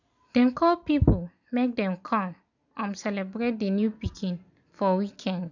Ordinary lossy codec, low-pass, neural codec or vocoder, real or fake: none; 7.2 kHz; none; real